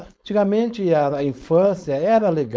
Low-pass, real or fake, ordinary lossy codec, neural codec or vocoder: none; fake; none; codec, 16 kHz, 4.8 kbps, FACodec